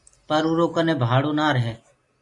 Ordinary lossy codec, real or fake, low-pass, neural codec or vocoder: MP3, 64 kbps; real; 10.8 kHz; none